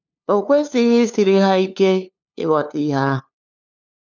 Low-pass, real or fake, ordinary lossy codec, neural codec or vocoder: 7.2 kHz; fake; none; codec, 16 kHz, 2 kbps, FunCodec, trained on LibriTTS, 25 frames a second